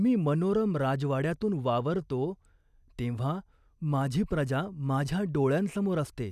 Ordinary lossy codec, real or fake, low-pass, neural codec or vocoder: none; real; 14.4 kHz; none